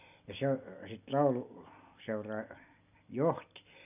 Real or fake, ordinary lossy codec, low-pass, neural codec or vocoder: real; none; 3.6 kHz; none